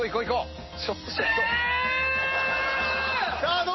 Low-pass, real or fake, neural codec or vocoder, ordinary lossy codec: 7.2 kHz; real; none; MP3, 24 kbps